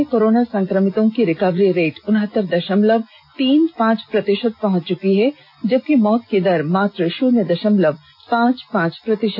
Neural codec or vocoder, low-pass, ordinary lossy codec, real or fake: none; 5.4 kHz; none; real